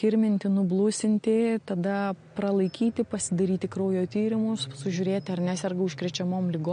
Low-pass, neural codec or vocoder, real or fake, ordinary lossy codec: 9.9 kHz; none; real; MP3, 48 kbps